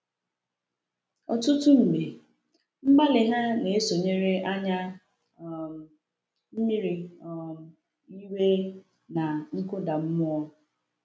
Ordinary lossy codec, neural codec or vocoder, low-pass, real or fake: none; none; none; real